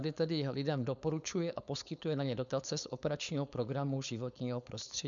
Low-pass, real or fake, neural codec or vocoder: 7.2 kHz; fake; codec, 16 kHz, 4.8 kbps, FACodec